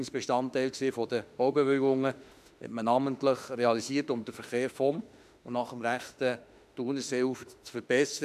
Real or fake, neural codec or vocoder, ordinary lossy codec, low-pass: fake; autoencoder, 48 kHz, 32 numbers a frame, DAC-VAE, trained on Japanese speech; none; 14.4 kHz